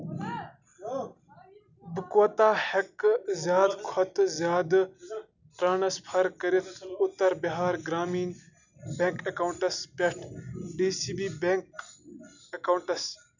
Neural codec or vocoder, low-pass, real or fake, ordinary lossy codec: none; 7.2 kHz; real; none